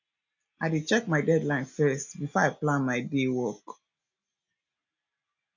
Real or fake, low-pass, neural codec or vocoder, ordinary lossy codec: real; 7.2 kHz; none; none